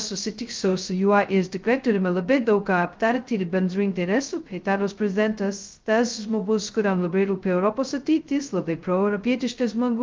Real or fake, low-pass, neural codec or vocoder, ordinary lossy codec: fake; 7.2 kHz; codec, 16 kHz, 0.2 kbps, FocalCodec; Opus, 24 kbps